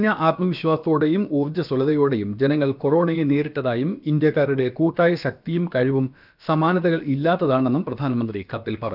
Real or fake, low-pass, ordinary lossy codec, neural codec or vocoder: fake; 5.4 kHz; none; codec, 16 kHz, about 1 kbps, DyCAST, with the encoder's durations